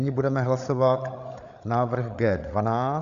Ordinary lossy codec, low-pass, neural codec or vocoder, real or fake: MP3, 96 kbps; 7.2 kHz; codec, 16 kHz, 8 kbps, FreqCodec, larger model; fake